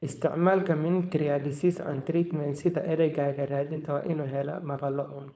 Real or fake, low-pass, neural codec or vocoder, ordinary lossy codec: fake; none; codec, 16 kHz, 4.8 kbps, FACodec; none